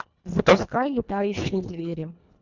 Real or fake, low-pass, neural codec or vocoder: fake; 7.2 kHz; codec, 24 kHz, 1.5 kbps, HILCodec